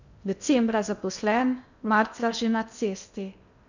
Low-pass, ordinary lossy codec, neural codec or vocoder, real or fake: 7.2 kHz; none; codec, 16 kHz in and 24 kHz out, 0.6 kbps, FocalCodec, streaming, 2048 codes; fake